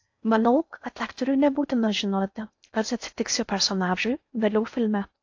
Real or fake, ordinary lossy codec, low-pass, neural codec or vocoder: fake; AAC, 48 kbps; 7.2 kHz; codec, 16 kHz in and 24 kHz out, 0.6 kbps, FocalCodec, streaming, 4096 codes